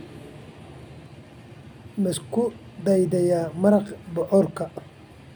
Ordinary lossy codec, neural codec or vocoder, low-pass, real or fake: none; none; none; real